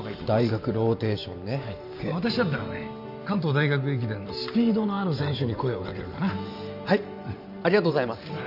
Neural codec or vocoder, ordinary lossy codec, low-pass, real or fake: vocoder, 44.1 kHz, 128 mel bands every 512 samples, BigVGAN v2; none; 5.4 kHz; fake